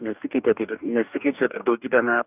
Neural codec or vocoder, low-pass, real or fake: codec, 44.1 kHz, 2.6 kbps, DAC; 3.6 kHz; fake